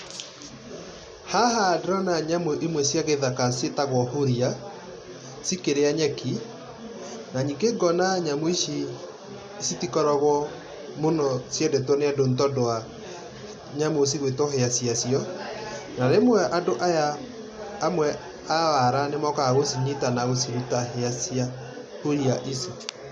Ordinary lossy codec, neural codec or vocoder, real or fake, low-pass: none; none; real; none